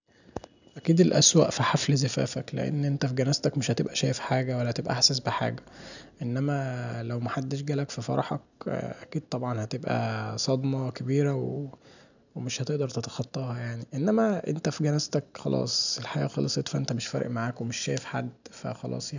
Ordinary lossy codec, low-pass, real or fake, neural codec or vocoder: none; 7.2 kHz; real; none